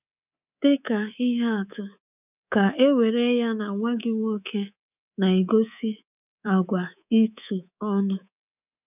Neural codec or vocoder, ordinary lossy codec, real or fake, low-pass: codec, 24 kHz, 3.1 kbps, DualCodec; none; fake; 3.6 kHz